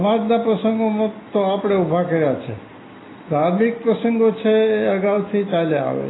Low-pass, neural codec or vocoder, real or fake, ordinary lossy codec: 7.2 kHz; none; real; AAC, 16 kbps